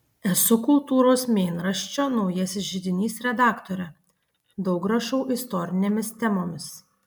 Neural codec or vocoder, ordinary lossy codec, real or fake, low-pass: none; MP3, 96 kbps; real; 19.8 kHz